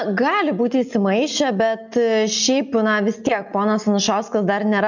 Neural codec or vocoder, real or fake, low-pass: none; real; 7.2 kHz